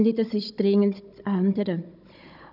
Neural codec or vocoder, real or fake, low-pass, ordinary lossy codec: codec, 16 kHz, 16 kbps, FunCodec, trained on Chinese and English, 50 frames a second; fake; 5.4 kHz; none